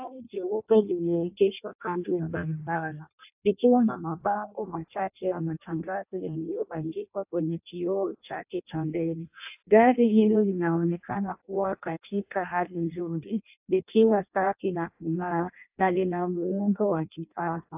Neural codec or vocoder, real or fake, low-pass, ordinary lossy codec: codec, 16 kHz in and 24 kHz out, 0.6 kbps, FireRedTTS-2 codec; fake; 3.6 kHz; AAC, 32 kbps